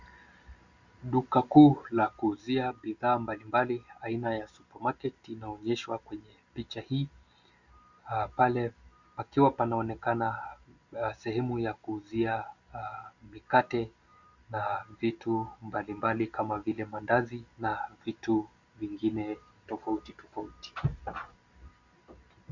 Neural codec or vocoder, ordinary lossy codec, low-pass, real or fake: none; Opus, 64 kbps; 7.2 kHz; real